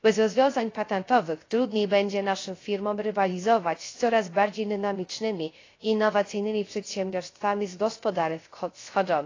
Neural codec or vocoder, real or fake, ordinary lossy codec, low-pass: codec, 16 kHz, 0.3 kbps, FocalCodec; fake; AAC, 32 kbps; 7.2 kHz